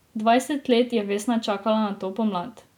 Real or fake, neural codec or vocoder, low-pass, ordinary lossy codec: real; none; 19.8 kHz; none